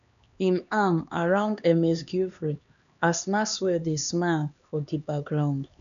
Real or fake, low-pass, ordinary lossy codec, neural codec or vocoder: fake; 7.2 kHz; none; codec, 16 kHz, 2 kbps, X-Codec, HuBERT features, trained on LibriSpeech